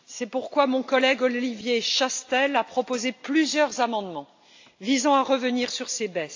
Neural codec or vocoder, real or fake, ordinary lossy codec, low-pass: none; real; AAC, 48 kbps; 7.2 kHz